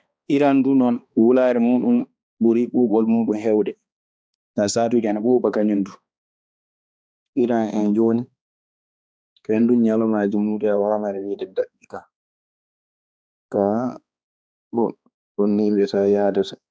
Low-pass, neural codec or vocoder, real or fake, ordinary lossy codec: none; codec, 16 kHz, 2 kbps, X-Codec, HuBERT features, trained on balanced general audio; fake; none